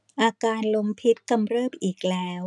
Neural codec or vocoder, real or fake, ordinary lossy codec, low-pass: none; real; none; 10.8 kHz